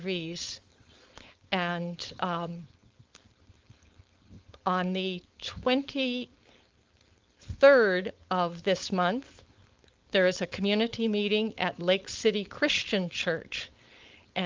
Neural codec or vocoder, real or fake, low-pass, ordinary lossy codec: codec, 16 kHz, 4.8 kbps, FACodec; fake; 7.2 kHz; Opus, 32 kbps